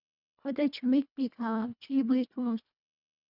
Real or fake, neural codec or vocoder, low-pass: fake; codec, 24 kHz, 1.5 kbps, HILCodec; 5.4 kHz